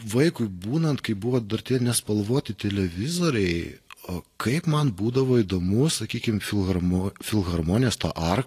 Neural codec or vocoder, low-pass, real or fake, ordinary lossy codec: none; 14.4 kHz; real; AAC, 48 kbps